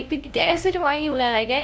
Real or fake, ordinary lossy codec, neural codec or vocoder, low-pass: fake; none; codec, 16 kHz, 0.5 kbps, FunCodec, trained on LibriTTS, 25 frames a second; none